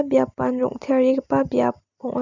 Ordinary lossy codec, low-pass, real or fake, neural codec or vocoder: none; 7.2 kHz; real; none